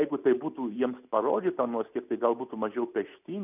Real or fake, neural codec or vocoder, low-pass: real; none; 3.6 kHz